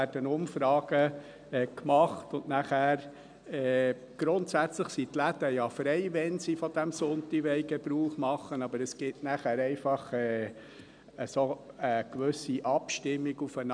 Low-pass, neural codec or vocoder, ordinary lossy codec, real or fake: 9.9 kHz; none; none; real